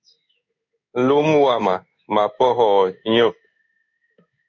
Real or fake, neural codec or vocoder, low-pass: fake; codec, 16 kHz in and 24 kHz out, 1 kbps, XY-Tokenizer; 7.2 kHz